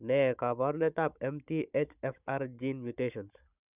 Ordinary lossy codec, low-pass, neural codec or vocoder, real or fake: none; 3.6 kHz; codec, 16 kHz, 16 kbps, FunCodec, trained on Chinese and English, 50 frames a second; fake